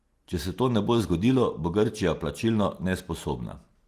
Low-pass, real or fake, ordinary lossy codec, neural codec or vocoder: 14.4 kHz; real; Opus, 24 kbps; none